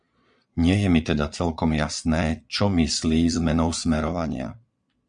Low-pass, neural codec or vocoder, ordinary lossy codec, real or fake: 9.9 kHz; vocoder, 22.05 kHz, 80 mel bands, Vocos; MP3, 96 kbps; fake